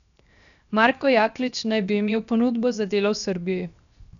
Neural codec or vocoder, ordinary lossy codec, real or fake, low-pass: codec, 16 kHz, 0.7 kbps, FocalCodec; none; fake; 7.2 kHz